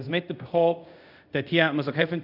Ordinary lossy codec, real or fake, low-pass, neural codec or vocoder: none; fake; 5.4 kHz; codec, 24 kHz, 0.5 kbps, DualCodec